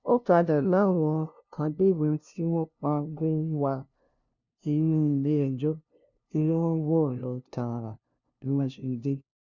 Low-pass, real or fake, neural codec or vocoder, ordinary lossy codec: 7.2 kHz; fake; codec, 16 kHz, 0.5 kbps, FunCodec, trained on LibriTTS, 25 frames a second; none